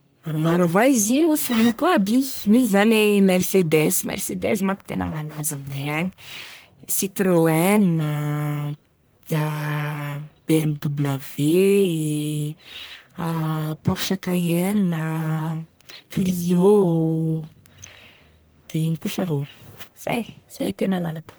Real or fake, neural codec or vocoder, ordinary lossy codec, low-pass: fake; codec, 44.1 kHz, 1.7 kbps, Pupu-Codec; none; none